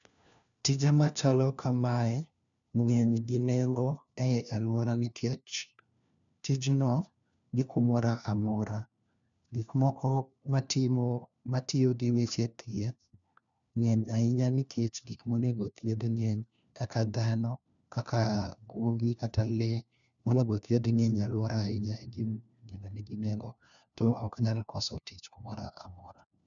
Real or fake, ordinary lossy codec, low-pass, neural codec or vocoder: fake; none; 7.2 kHz; codec, 16 kHz, 1 kbps, FunCodec, trained on LibriTTS, 50 frames a second